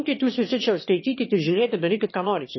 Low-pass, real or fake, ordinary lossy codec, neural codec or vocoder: 7.2 kHz; fake; MP3, 24 kbps; autoencoder, 22.05 kHz, a latent of 192 numbers a frame, VITS, trained on one speaker